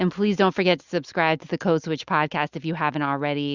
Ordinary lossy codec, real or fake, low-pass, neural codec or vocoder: Opus, 64 kbps; real; 7.2 kHz; none